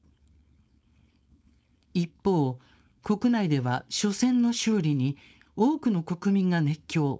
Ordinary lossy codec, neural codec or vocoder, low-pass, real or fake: none; codec, 16 kHz, 4.8 kbps, FACodec; none; fake